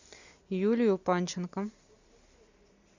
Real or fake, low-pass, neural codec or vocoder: real; 7.2 kHz; none